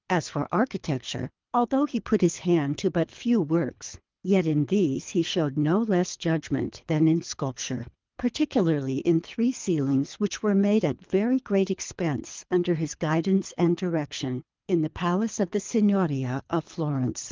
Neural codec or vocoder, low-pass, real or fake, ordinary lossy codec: codec, 24 kHz, 3 kbps, HILCodec; 7.2 kHz; fake; Opus, 24 kbps